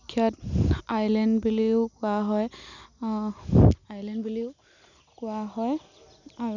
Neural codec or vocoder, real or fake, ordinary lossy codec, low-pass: none; real; none; 7.2 kHz